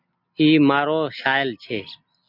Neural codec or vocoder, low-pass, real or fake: none; 5.4 kHz; real